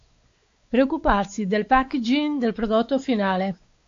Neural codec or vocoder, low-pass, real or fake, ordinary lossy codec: codec, 16 kHz, 4 kbps, X-Codec, WavLM features, trained on Multilingual LibriSpeech; 7.2 kHz; fake; AAC, 48 kbps